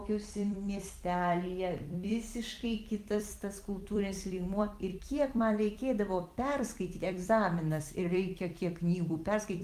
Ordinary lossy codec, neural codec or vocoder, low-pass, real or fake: Opus, 24 kbps; vocoder, 44.1 kHz, 128 mel bands every 256 samples, BigVGAN v2; 14.4 kHz; fake